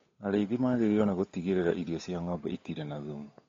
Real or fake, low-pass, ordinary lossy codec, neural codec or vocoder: fake; 7.2 kHz; AAC, 32 kbps; codec, 16 kHz, 8 kbps, FunCodec, trained on Chinese and English, 25 frames a second